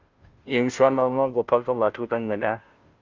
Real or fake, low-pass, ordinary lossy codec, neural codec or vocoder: fake; 7.2 kHz; Opus, 32 kbps; codec, 16 kHz, 0.5 kbps, FunCodec, trained on Chinese and English, 25 frames a second